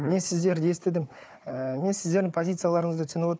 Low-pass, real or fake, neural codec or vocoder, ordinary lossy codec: none; fake; codec, 16 kHz, 4 kbps, FunCodec, trained on Chinese and English, 50 frames a second; none